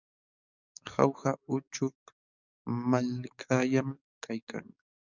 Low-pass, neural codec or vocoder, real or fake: 7.2 kHz; codec, 44.1 kHz, 7.8 kbps, DAC; fake